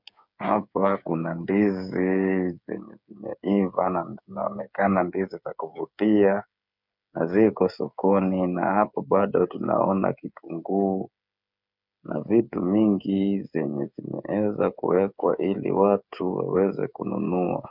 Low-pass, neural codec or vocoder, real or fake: 5.4 kHz; codec, 16 kHz, 8 kbps, FreqCodec, smaller model; fake